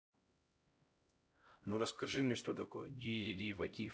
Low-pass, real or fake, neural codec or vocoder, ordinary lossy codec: none; fake; codec, 16 kHz, 0.5 kbps, X-Codec, HuBERT features, trained on LibriSpeech; none